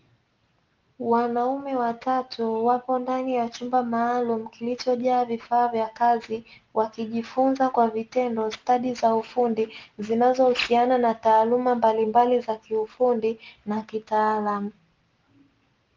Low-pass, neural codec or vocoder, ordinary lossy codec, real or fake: 7.2 kHz; none; Opus, 32 kbps; real